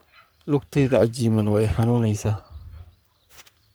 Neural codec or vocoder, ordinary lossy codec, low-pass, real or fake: codec, 44.1 kHz, 3.4 kbps, Pupu-Codec; none; none; fake